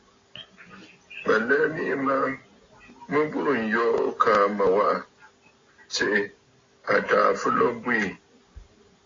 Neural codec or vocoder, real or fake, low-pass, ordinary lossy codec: none; real; 7.2 kHz; AAC, 32 kbps